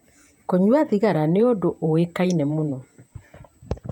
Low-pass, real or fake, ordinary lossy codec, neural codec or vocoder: 19.8 kHz; fake; none; vocoder, 48 kHz, 128 mel bands, Vocos